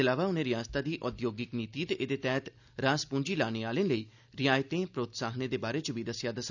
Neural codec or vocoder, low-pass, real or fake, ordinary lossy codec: none; 7.2 kHz; real; none